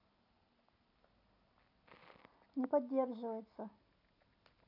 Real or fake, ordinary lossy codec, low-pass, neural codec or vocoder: real; none; 5.4 kHz; none